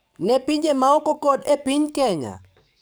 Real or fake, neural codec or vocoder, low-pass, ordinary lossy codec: fake; codec, 44.1 kHz, 7.8 kbps, DAC; none; none